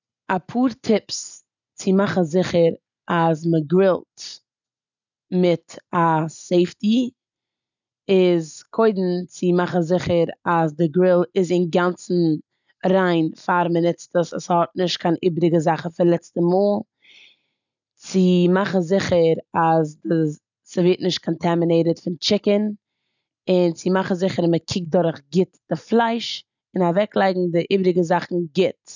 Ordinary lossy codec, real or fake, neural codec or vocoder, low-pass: none; real; none; 7.2 kHz